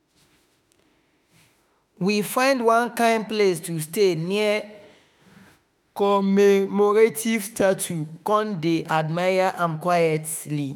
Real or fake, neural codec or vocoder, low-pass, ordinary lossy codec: fake; autoencoder, 48 kHz, 32 numbers a frame, DAC-VAE, trained on Japanese speech; 19.8 kHz; none